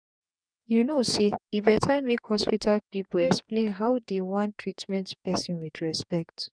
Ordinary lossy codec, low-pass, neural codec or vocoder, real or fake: none; 9.9 kHz; codec, 44.1 kHz, 2.6 kbps, DAC; fake